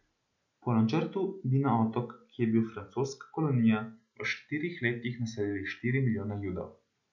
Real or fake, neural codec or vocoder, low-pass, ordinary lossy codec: real; none; 7.2 kHz; none